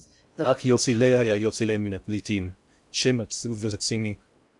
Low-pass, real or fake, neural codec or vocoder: 10.8 kHz; fake; codec, 16 kHz in and 24 kHz out, 0.6 kbps, FocalCodec, streaming, 4096 codes